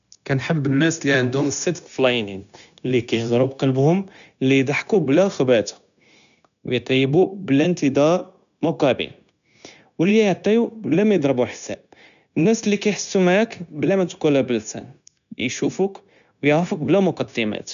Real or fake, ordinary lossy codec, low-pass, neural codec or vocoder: fake; none; 7.2 kHz; codec, 16 kHz, 0.9 kbps, LongCat-Audio-Codec